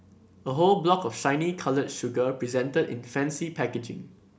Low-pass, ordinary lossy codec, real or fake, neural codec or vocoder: none; none; real; none